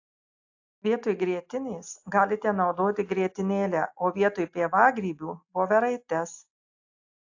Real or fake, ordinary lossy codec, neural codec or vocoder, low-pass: real; AAC, 48 kbps; none; 7.2 kHz